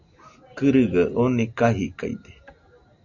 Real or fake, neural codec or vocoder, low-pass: real; none; 7.2 kHz